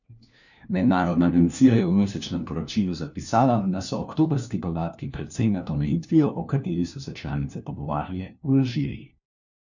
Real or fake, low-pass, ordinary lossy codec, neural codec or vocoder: fake; 7.2 kHz; none; codec, 16 kHz, 1 kbps, FunCodec, trained on LibriTTS, 50 frames a second